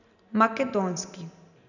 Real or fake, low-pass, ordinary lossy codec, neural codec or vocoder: real; 7.2 kHz; none; none